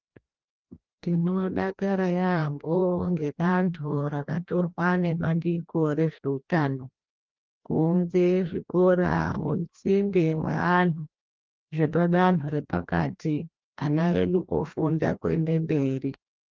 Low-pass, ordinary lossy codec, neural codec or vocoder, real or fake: 7.2 kHz; Opus, 32 kbps; codec, 16 kHz, 1 kbps, FreqCodec, larger model; fake